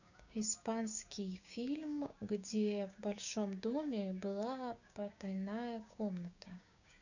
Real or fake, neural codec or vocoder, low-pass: fake; codec, 16 kHz, 6 kbps, DAC; 7.2 kHz